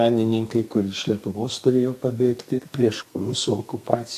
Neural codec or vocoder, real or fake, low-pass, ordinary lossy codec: codec, 32 kHz, 1.9 kbps, SNAC; fake; 14.4 kHz; AAC, 64 kbps